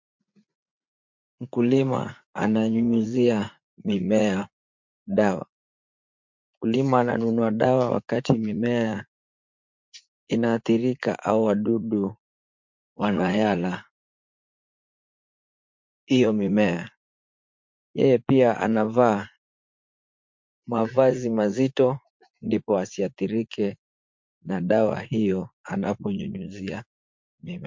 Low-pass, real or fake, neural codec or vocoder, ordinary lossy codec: 7.2 kHz; fake; vocoder, 44.1 kHz, 80 mel bands, Vocos; MP3, 48 kbps